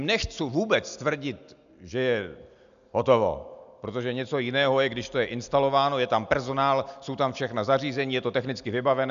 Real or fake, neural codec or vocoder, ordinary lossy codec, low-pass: real; none; AAC, 96 kbps; 7.2 kHz